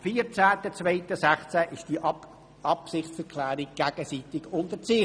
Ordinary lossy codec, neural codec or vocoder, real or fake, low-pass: none; none; real; 9.9 kHz